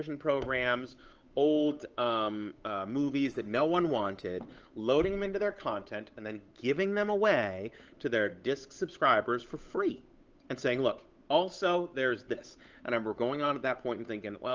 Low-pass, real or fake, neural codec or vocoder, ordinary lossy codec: 7.2 kHz; fake; codec, 16 kHz, 8 kbps, FunCodec, trained on Chinese and English, 25 frames a second; Opus, 24 kbps